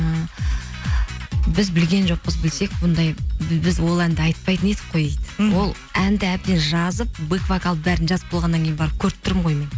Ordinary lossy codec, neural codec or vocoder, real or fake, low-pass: none; none; real; none